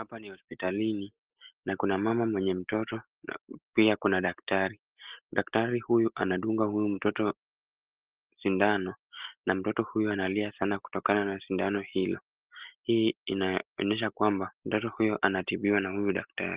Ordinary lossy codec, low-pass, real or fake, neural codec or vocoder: Opus, 16 kbps; 3.6 kHz; real; none